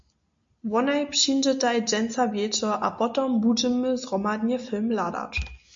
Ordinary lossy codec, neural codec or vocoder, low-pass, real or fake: MP3, 48 kbps; none; 7.2 kHz; real